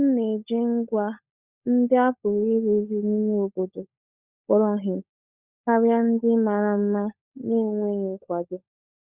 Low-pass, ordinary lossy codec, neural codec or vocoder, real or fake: 3.6 kHz; Opus, 64 kbps; codec, 16 kHz, 16 kbps, FunCodec, trained on LibriTTS, 50 frames a second; fake